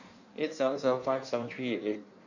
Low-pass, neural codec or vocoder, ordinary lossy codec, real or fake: 7.2 kHz; codec, 16 kHz in and 24 kHz out, 1.1 kbps, FireRedTTS-2 codec; none; fake